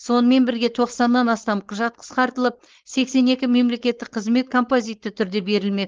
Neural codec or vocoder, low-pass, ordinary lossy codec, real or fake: codec, 16 kHz, 4.8 kbps, FACodec; 7.2 kHz; Opus, 16 kbps; fake